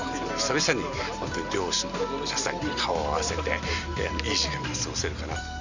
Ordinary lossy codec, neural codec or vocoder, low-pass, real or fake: none; none; 7.2 kHz; real